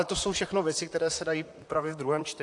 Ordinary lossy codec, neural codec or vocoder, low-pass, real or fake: AAC, 64 kbps; vocoder, 44.1 kHz, 128 mel bands, Pupu-Vocoder; 10.8 kHz; fake